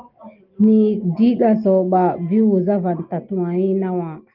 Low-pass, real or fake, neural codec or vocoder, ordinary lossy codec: 5.4 kHz; real; none; Opus, 32 kbps